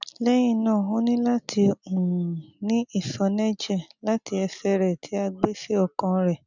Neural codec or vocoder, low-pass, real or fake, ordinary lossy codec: none; 7.2 kHz; real; none